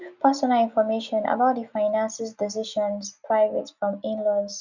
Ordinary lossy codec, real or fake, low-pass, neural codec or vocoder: none; real; 7.2 kHz; none